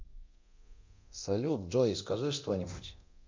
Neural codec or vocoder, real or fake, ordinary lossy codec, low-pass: codec, 24 kHz, 0.9 kbps, DualCodec; fake; MP3, 48 kbps; 7.2 kHz